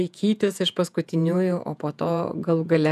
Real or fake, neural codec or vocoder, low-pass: fake; vocoder, 48 kHz, 128 mel bands, Vocos; 14.4 kHz